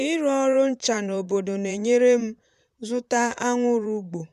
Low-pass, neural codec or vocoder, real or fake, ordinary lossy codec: 14.4 kHz; vocoder, 44.1 kHz, 128 mel bands, Pupu-Vocoder; fake; none